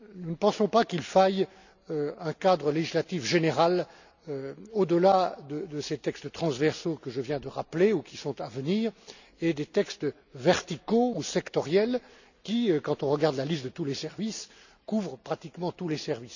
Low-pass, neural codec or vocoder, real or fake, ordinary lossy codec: 7.2 kHz; none; real; none